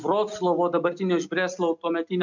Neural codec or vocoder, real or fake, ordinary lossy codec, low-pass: none; real; MP3, 64 kbps; 7.2 kHz